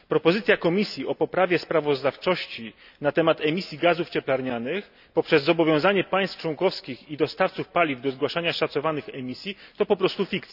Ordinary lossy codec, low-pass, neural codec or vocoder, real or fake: none; 5.4 kHz; none; real